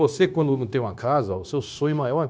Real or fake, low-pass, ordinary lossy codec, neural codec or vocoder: fake; none; none; codec, 16 kHz, 0.9 kbps, LongCat-Audio-Codec